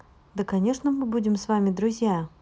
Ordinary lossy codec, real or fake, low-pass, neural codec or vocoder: none; real; none; none